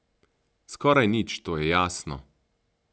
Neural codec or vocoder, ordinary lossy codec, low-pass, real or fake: none; none; none; real